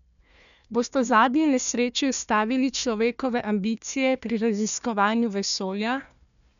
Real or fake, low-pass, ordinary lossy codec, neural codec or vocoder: fake; 7.2 kHz; none; codec, 16 kHz, 1 kbps, FunCodec, trained on Chinese and English, 50 frames a second